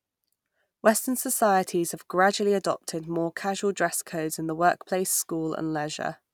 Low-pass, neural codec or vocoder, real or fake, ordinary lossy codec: none; none; real; none